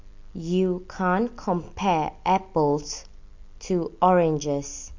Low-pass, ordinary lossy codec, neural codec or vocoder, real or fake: 7.2 kHz; MP3, 48 kbps; none; real